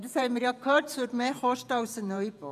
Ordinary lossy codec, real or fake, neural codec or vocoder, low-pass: none; fake; vocoder, 48 kHz, 128 mel bands, Vocos; 14.4 kHz